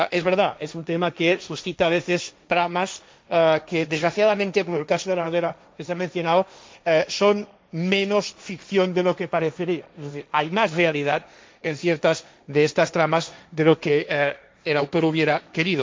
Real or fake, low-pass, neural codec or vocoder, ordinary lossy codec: fake; none; codec, 16 kHz, 1.1 kbps, Voila-Tokenizer; none